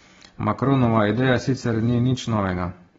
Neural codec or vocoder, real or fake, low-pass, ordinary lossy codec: none; real; 7.2 kHz; AAC, 24 kbps